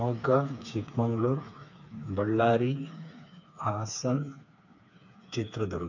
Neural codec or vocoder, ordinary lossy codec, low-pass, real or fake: codec, 16 kHz, 4 kbps, FreqCodec, smaller model; none; 7.2 kHz; fake